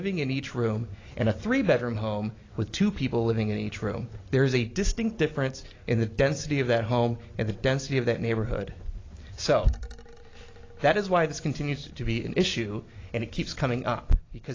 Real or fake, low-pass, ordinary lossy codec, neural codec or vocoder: real; 7.2 kHz; AAC, 32 kbps; none